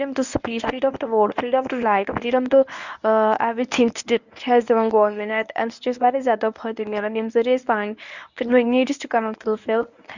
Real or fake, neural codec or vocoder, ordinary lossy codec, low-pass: fake; codec, 24 kHz, 0.9 kbps, WavTokenizer, medium speech release version 1; none; 7.2 kHz